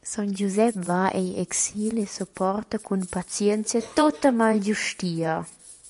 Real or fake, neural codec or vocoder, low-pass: fake; vocoder, 24 kHz, 100 mel bands, Vocos; 10.8 kHz